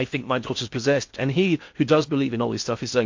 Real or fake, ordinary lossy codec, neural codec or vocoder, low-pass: fake; MP3, 48 kbps; codec, 16 kHz in and 24 kHz out, 0.6 kbps, FocalCodec, streaming, 4096 codes; 7.2 kHz